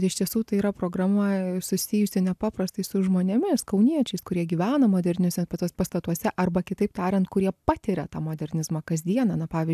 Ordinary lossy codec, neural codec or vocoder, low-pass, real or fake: AAC, 96 kbps; none; 14.4 kHz; real